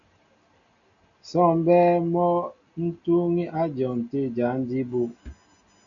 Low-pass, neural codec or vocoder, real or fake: 7.2 kHz; none; real